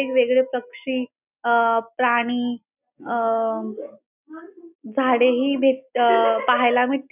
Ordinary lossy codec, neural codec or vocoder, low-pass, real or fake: none; none; 3.6 kHz; real